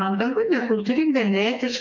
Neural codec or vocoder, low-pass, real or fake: codec, 16 kHz, 2 kbps, FreqCodec, smaller model; 7.2 kHz; fake